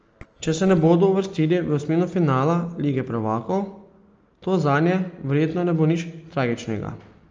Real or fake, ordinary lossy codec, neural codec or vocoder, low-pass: real; Opus, 24 kbps; none; 7.2 kHz